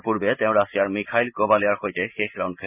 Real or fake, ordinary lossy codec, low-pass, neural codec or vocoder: real; none; 3.6 kHz; none